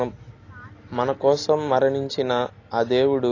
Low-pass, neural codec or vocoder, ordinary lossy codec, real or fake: 7.2 kHz; none; AAC, 32 kbps; real